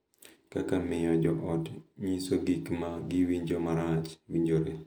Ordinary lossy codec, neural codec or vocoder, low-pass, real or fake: none; none; none; real